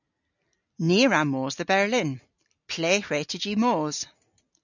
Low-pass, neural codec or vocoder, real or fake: 7.2 kHz; none; real